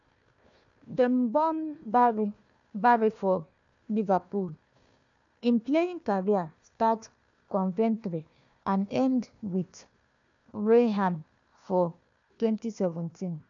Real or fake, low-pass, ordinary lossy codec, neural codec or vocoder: fake; 7.2 kHz; none; codec, 16 kHz, 1 kbps, FunCodec, trained on Chinese and English, 50 frames a second